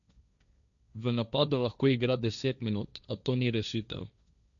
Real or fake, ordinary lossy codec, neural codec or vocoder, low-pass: fake; none; codec, 16 kHz, 1.1 kbps, Voila-Tokenizer; 7.2 kHz